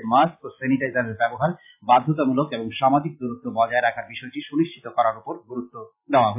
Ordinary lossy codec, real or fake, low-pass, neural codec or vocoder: AAC, 32 kbps; real; 3.6 kHz; none